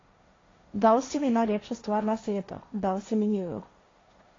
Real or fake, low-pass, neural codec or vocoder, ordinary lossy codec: fake; 7.2 kHz; codec, 16 kHz, 1.1 kbps, Voila-Tokenizer; AAC, 32 kbps